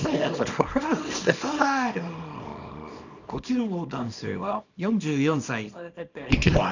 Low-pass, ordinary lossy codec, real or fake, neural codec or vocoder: 7.2 kHz; none; fake; codec, 24 kHz, 0.9 kbps, WavTokenizer, small release